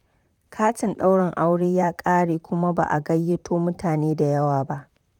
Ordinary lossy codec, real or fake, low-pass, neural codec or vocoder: none; fake; 19.8 kHz; vocoder, 44.1 kHz, 128 mel bands, Pupu-Vocoder